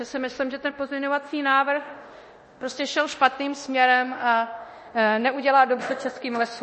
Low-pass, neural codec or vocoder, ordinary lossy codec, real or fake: 10.8 kHz; codec, 24 kHz, 0.9 kbps, DualCodec; MP3, 32 kbps; fake